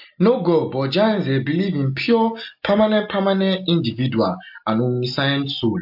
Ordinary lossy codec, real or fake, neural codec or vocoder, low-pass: MP3, 48 kbps; real; none; 5.4 kHz